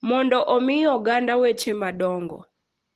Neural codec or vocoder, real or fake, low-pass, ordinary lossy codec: none; real; 14.4 kHz; Opus, 16 kbps